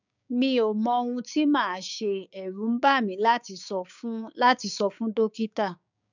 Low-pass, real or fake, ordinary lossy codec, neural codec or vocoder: 7.2 kHz; fake; none; codec, 16 kHz, 6 kbps, DAC